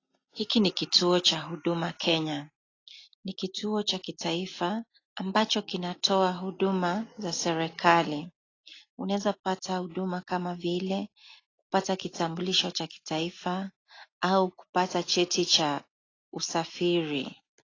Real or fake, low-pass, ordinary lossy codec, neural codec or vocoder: real; 7.2 kHz; AAC, 32 kbps; none